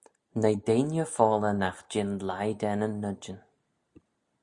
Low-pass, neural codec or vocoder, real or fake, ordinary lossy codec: 10.8 kHz; none; real; Opus, 64 kbps